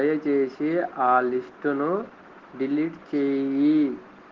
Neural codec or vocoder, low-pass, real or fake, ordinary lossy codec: none; 7.2 kHz; real; Opus, 16 kbps